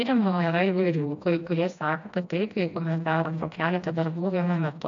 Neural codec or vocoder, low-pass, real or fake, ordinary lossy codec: codec, 16 kHz, 1 kbps, FreqCodec, smaller model; 7.2 kHz; fake; AAC, 64 kbps